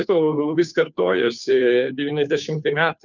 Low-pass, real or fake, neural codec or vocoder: 7.2 kHz; fake; codec, 16 kHz, 2 kbps, FunCodec, trained on Chinese and English, 25 frames a second